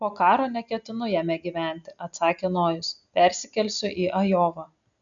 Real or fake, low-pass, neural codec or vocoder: real; 7.2 kHz; none